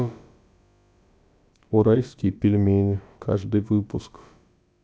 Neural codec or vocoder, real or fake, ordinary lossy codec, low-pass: codec, 16 kHz, about 1 kbps, DyCAST, with the encoder's durations; fake; none; none